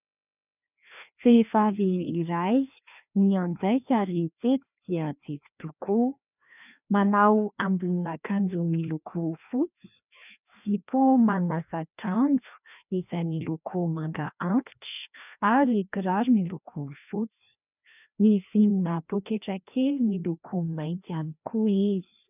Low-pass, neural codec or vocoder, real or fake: 3.6 kHz; codec, 16 kHz, 1 kbps, FreqCodec, larger model; fake